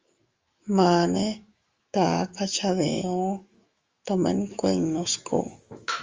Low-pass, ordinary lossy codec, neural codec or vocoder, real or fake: 7.2 kHz; Opus, 32 kbps; autoencoder, 48 kHz, 128 numbers a frame, DAC-VAE, trained on Japanese speech; fake